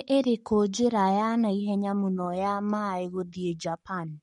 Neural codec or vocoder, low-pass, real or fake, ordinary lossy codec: codec, 44.1 kHz, 7.8 kbps, Pupu-Codec; 19.8 kHz; fake; MP3, 48 kbps